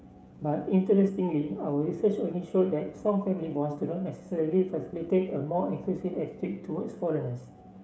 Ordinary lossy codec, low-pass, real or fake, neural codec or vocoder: none; none; fake; codec, 16 kHz, 16 kbps, FreqCodec, smaller model